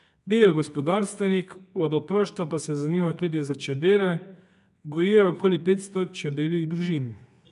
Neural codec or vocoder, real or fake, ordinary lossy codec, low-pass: codec, 24 kHz, 0.9 kbps, WavTokenizer, medium music audio release; fake; none; 10.8 kHz